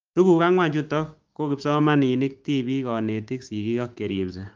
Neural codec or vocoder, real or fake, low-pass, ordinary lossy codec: codec, 16 kHz, 6 kbps, DAC; fake; 7.2 kHz; Opus, 32 kbps